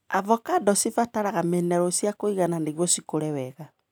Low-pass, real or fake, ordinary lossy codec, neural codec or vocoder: none; real; none; none